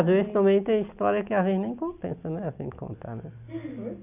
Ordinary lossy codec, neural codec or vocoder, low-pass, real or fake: none; none; 3.6 kHz; real